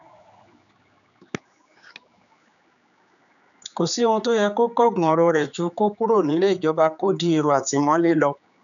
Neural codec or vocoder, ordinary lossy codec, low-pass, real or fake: codec, 16 kHz, 4 kbps, X-Codec, HuBERT features, trained on general audio; none; 7.2 kHz; fake